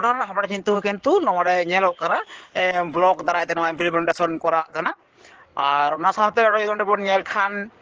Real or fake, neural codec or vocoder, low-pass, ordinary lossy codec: fake; codec, 16 kHz in and 24 kHz out, 2.2 kbps, FireRedTTS-2 codec; 7.2 kHz; Opus, 16 kbps